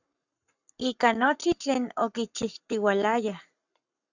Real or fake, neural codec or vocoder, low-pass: fake; codec, 44.1 kHz, 7.8 kbps, Pupu-Codec; 7.2 kHz